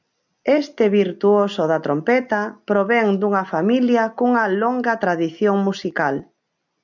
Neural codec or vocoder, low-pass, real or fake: none; 7.2 kHz; real